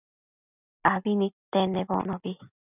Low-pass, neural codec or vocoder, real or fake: 3.6 kHz; none; real